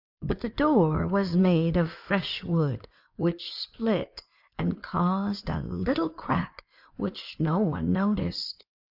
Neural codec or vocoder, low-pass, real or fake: codec, 16 kHz in and 24 kHz out, 2.2 kbps, FireRedTTS-2 codec; 5.4 kHz; fake